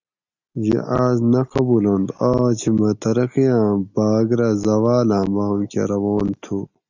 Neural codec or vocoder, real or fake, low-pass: none; real; 7.2 kHz